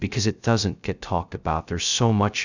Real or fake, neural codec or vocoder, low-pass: fake; codec, 16 kHz, 0.2 kbps, FocalCodec; 7.2 kHz